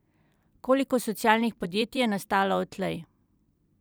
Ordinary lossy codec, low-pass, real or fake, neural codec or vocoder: none; none; fake; vocoder, 44.1 kHz, 128 mel bands every 256 samples, BigVGAN v2